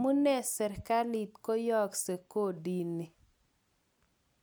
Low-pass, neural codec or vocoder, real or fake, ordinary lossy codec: none; none; real; none